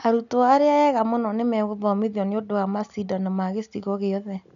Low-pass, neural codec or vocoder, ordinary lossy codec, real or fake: 7.2 kHz; none; none; real